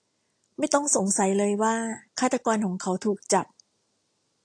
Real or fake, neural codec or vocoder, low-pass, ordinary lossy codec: real; none; 9.9 kHz; AAC, 48 kbps